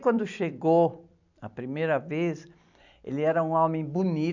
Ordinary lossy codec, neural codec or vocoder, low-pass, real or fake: none; vocoder, 44.1 kHz, 128 mel bands every 256 samples, BigVGAN v2; 7.2 kHz; fake